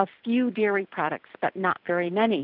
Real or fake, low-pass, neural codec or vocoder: real; 5.4 kHz; none